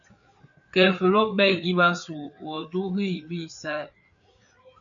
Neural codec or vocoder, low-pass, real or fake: codec, 16 kHz, 4 kbps, FreqCodec, larger model; 7.2 kHz; fake